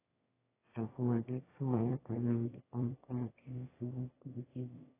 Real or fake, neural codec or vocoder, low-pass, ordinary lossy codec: fake; autoencoder, 22.05 kHz, a latent of 192 numbers a frame, VITS, trained on one speaker; 3.6 kHz; AAC, 16 kbps